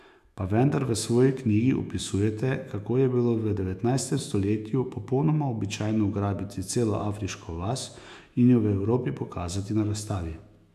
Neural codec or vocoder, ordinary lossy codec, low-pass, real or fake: autoencoder, 48 kHz, 128 numbers a frame, DAC-VAE, trained on Japanese speech; none; 14.4 kHz; fake